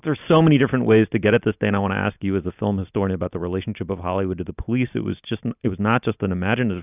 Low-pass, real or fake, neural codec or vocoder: 3.6 kHz; real; none